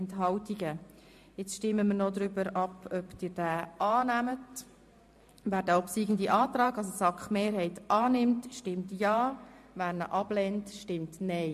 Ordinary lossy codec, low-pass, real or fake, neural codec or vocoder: MP3, 64 kbps; 14.4 kHz; fake; vocoder, 44.1 kHz, 128 mel bands every 512 samples, BigVGAN v2